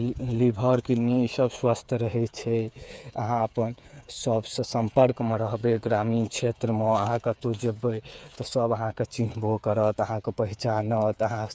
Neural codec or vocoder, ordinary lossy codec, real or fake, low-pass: codec, 16 kHz, 8 kbps, FreqCodec, smaller model; none; fake; none